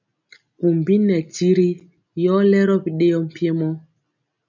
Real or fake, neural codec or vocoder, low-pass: real; none; 7.2 kHz